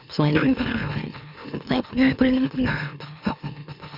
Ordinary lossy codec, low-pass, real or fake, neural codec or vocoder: none; 5.4 kHz; fake; autoencoder, 44.1 kHz, a latent of 192 numbers a frame, MeloTTS